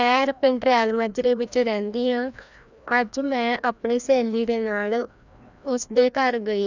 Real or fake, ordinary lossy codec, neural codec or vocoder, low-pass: fake; none; codec, 16 kHz, 1 kbps, FreqCodec, larger model; 7.2 kHz